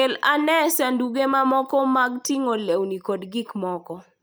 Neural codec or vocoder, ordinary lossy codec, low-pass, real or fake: none; none; none; real